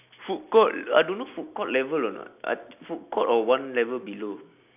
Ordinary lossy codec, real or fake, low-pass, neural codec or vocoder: none; real; 3.6 kHz; none